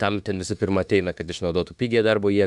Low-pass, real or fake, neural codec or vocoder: 10.8 kHz; fake; autoencoder, 48 kHz, 32 numbers a frame, DAC-VAE, trained on Japanese speech